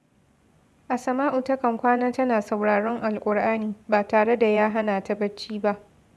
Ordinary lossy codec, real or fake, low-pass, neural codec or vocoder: none; fake; none; vocoder, 24 kHz, 100 mel bands, Vocos